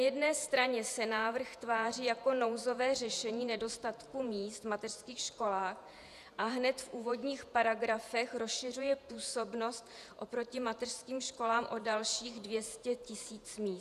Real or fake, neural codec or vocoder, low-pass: fake; vocoder, 48 kHz, 128 mel bands, Vocos; 14.4 kHz